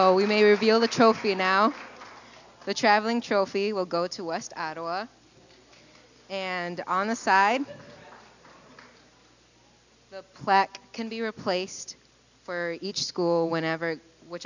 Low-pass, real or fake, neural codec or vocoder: 7.2 kHz; real; none